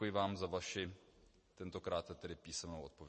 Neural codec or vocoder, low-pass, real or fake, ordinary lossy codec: none; 10.8 kHz; real; MP3, 32 kbps